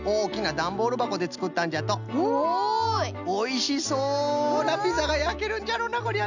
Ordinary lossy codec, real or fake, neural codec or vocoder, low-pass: none; real; none; 7.2 kHz